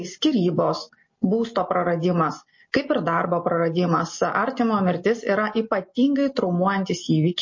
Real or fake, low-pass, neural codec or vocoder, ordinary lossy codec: real; 7.2 kHz; none; MP3, 32 kbps